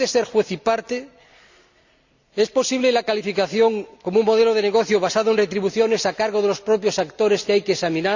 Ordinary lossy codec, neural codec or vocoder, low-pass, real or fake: Opus, 64 kbps; none; 7.2 kHz; real